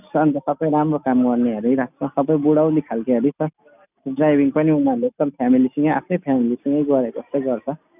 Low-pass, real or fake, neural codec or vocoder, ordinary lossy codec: 3.6 kHz; real; none; none